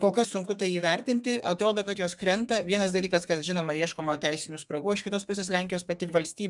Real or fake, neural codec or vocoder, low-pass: fake; codec, 32 kHz, 1.9 kbps, SNAC; 10.8 kHz